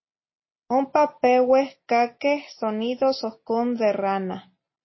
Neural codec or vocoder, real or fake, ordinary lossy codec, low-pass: none; real; MP3, 24 kbps; 7.2 kHz